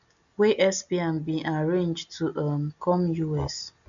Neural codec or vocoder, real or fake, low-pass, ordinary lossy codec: none; real; 7.2 kHz; none